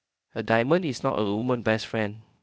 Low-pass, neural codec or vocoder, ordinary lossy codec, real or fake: none; codec, 16 kHz, 0.8 kbps, ZipCodec; none; fake